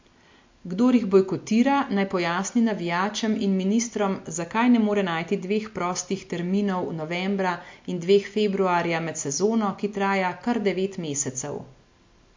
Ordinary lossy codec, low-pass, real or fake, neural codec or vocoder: MP3, 48 kbps; 7.2 kHz; real; none